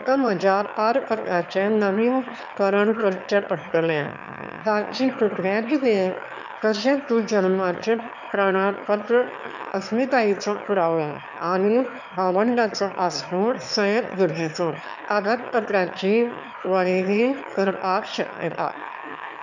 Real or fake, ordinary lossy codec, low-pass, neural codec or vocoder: fake; none; 7.2 kHz; autoencoder, 22.05 kHz, a latent of 192 numbers a frame, VITS, trained on one speaker